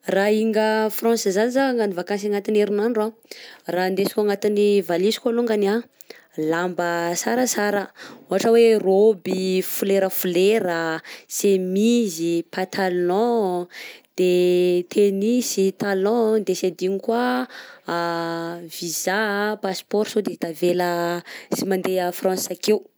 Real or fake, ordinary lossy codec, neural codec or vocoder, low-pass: real; none; none; none